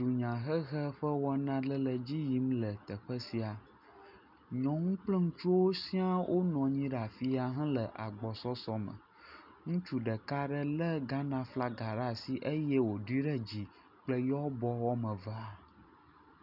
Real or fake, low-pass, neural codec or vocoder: real; 5.4 kHz; none